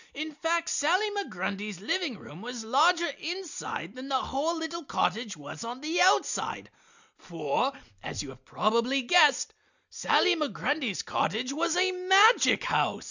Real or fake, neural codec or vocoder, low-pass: real; none; 7.2 kHz